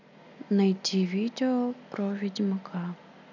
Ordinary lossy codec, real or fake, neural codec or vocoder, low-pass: none; real; none; 7.2 kHz